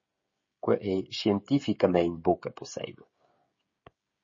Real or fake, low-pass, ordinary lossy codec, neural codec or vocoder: fake; 7.2 kHz; MP3, 32 kbps; codec, 16 kHz, 16 kbps, FreqCodec, smaller model